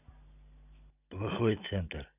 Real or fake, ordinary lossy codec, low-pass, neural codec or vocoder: real; none; 3.6 kHz; none